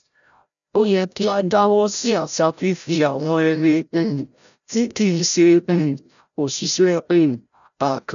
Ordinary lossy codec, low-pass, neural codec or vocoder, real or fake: none; 7.2 kHz; codec, 16 kHz, 0.5 kbps, FreqCodec, larger model; fake